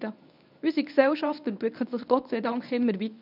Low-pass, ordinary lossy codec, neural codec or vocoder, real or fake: 5.4 kHz; none; codec, 24 kHz, 0.9 kbps, WavTokenizer, medium speech release version 1; fake